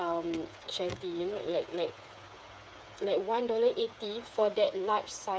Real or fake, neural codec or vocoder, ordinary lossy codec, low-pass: fake; codec, 16 kHz, 8 kbps, FreqCodec, smaller model; none; none